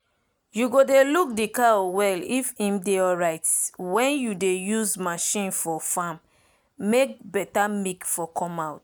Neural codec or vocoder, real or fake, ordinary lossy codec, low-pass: none; real; none; none